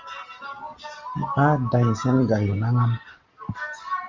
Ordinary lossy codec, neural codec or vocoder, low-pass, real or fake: Opus, 32 kbps; none; 7.2 kHz; real